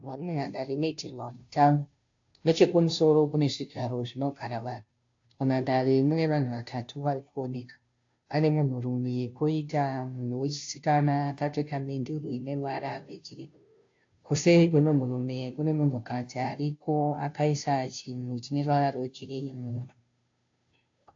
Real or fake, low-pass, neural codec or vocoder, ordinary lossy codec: fake; 7.2 kHz; codec, 16 kHz, 0.5 kbps, FunCodec, trained on Chinese and English, 25 frames a second; AAC, 48 kbps